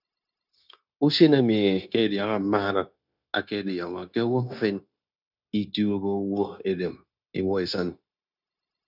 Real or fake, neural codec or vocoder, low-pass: fake; codec, 16 kHz, 0.9 kbps, LongCat-Audio-Codec; 5.4 kHz